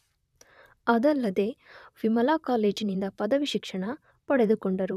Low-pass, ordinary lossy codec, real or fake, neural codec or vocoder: 14.4 kHz; none; fake; vocoder, 44.1 kHz, 128 mel bands, Pupu-Vocoder